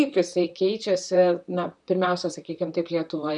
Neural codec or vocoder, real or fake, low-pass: vocoder, 44.1 kHz, 128 mel bands, Pupu-Vocoder; fake; 10.8 kHz